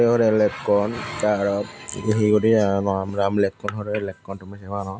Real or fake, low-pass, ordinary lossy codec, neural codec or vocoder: real; none; none; none